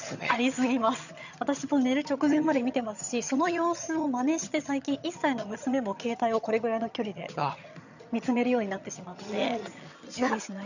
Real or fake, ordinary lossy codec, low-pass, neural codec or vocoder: fake; none; 7.2 kHz; vocoder, 22.05 kHz, 80 mel bands, HiFi-GAN